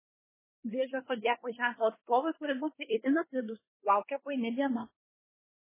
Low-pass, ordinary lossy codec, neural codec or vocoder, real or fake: 3.6 kHz; MP3, 16 kbps; codec, 24 kHz, 1 kbps, SNAC; fake